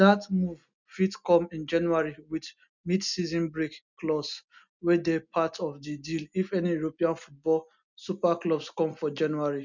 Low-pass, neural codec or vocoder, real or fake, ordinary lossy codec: 7.2 kHz; none; real; none